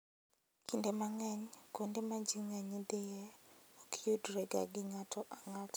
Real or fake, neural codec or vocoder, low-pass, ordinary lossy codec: real; none; none; none